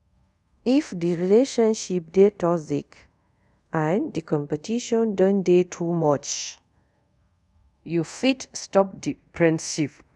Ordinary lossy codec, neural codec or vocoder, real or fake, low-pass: none; codec, 24 kHz, 0.5 kbps, DualCodec; fake; none